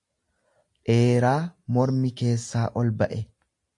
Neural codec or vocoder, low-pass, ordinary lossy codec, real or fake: none; 10.8 kHz; AAC, 64 kbps; real